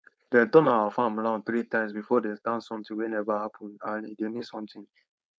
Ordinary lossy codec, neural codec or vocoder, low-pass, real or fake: none; codec, 16 kHz, 4.8 kbps, FACodec; none; fake